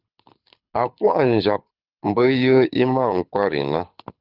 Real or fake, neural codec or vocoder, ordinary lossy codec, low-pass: fake; codec, 24 kHz, 6 kbps, HILCodec; Opus, 32 kbps; 5.4 kHz